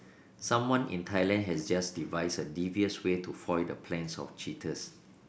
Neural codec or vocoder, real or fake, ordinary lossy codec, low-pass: none; real; none; none